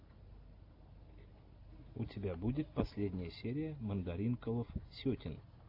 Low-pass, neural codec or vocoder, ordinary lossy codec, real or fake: 5.4 kHz; none; AAC, 32 kbps; real